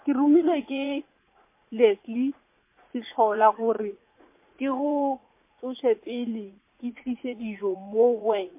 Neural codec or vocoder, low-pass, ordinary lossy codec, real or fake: vocoder, 44.1 kHz, 80 mel bands, Vocos; 3.6 kHz; MP3, 24 kbps; fake